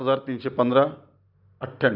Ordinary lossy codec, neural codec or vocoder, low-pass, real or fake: none; none; 5.4 kHz; real